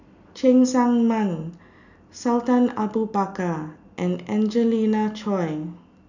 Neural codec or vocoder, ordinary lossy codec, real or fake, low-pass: none; none; real; 7.2 kHz